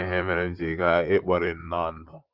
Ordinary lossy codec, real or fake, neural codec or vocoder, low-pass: Opus, 24 kbps; fake; vocoder, 44.1 kHz, 128 mel bands, Pupu-Vocoder; 5.4 kHz